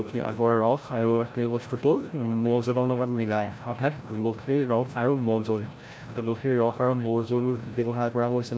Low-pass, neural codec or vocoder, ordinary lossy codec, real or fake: none; codec, 16 kHz, 0.5 kbps, FreqCodec, larger model; none; fake